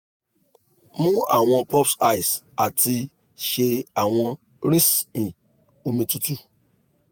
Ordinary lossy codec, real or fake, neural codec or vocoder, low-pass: none; fake; vocoder, 48 kHz, 128 mel bands, Vocos; none